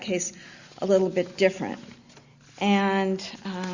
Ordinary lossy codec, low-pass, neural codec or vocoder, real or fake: Opus, 64 kbps; 7.2 kHz; none; real